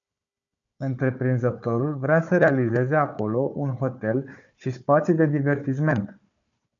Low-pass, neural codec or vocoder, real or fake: 7.2 kHz; codec, 16 kHz, 4 kbps, FunCodec, trained on Chinese and English, 50 frames a second; fake